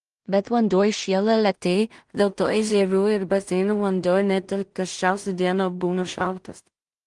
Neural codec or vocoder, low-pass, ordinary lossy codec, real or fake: codec, 16 kHz in and 24 kHz out, 0.4 kbps, LongCat-Audio-Codec, two codebook decoder; 10.8 kHz; Opus, 24 kbps; fake